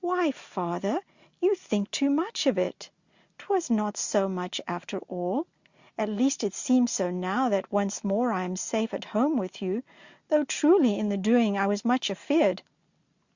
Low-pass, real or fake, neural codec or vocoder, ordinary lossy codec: 7.2 kHz; real; none; Opus, 64 kbps